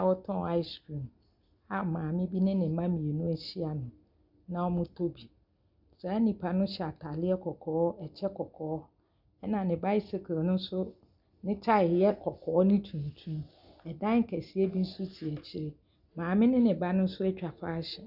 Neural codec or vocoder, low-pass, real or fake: none; 5.4 kHz; real